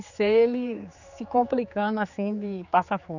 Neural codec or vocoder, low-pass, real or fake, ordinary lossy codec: codec, 16 kHz, 4 kbps, X-Codec, HuBERT features, trained on general audio; 7.2 kHz; fake; none